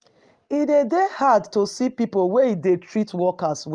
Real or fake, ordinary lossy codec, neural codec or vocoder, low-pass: real; Opus, 32 kbps; none; 9.9 kHz